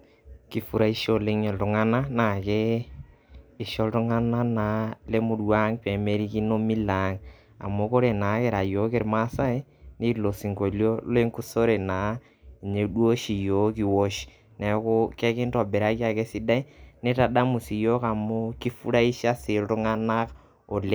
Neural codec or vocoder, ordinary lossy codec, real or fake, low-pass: none; none; real; none